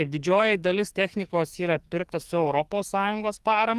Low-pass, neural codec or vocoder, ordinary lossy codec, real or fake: 14.4 kHz; codec, 44.1 kHz, 2.6 kbps, SNAC; Opus, 32 kbps; fake